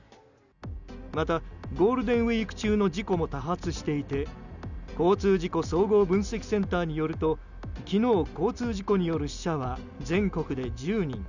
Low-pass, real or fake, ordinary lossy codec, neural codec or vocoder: 7.2 kHz; real; none; none